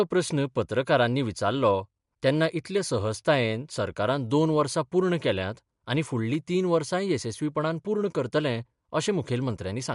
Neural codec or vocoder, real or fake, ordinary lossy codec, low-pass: none; real; MP3, 64 kbps; 10.8 kHz